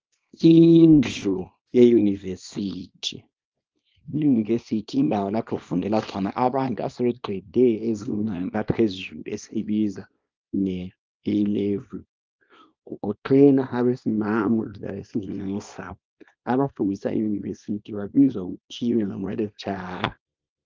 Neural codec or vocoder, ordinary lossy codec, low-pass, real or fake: codec, 24 kHz, 0.9 kbps, WavTokenizer, small release; Opus, 24 kbps; 7.2 kHz; fake